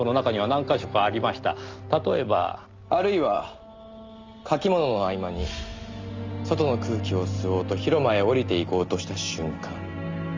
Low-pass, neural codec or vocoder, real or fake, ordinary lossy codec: 7.2 kHz; none; real; Opus, 32 kbps